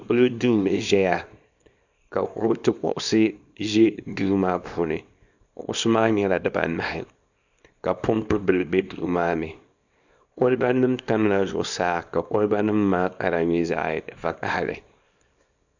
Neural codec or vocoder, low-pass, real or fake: codec, 24 kHz, 0.9 kbps, WavTokenizer, small release; 7.2 kHz; fake